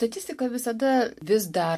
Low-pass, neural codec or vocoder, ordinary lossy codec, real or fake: 14.4 kHz; none; MP3, 64 kbps; real